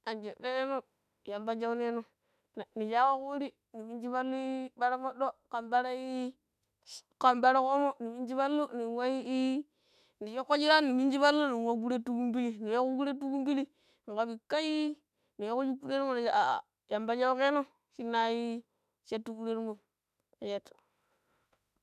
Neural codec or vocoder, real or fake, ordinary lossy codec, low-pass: autoencoder, 48 kHz, 32 numbers a frame, DAC-VAE, trained on Japanese speech; fake; none; 14.4 kHz